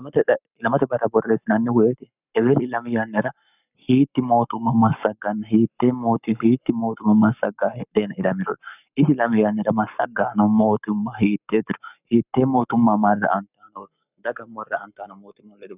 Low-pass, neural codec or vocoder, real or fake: 3.6 kHz; codec, 44.1 kHz, 7.8 kbps, Pupu-Codec; fake